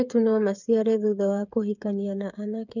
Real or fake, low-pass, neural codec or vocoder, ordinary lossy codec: fake; 7.2 kHz; codec, 16 kHz, 8 kbps, FreqCodec, smaller model; none